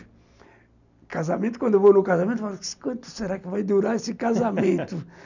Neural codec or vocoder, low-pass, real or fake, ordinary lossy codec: none; 7.2 kHz; real; none